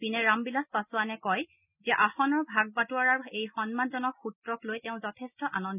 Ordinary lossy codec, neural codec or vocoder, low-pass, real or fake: none; none; 3.6 kHz; real